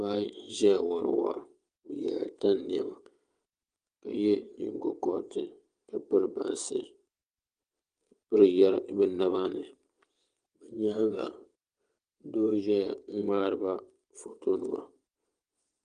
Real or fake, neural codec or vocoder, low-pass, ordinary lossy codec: fake; vocoder, 22.05 kHz, 80 mel bands, WaveNeXt; 9.9 kHz; Opus, 32 kbps